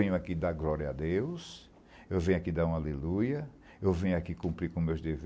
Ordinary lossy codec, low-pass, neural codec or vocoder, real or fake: none; none; none; real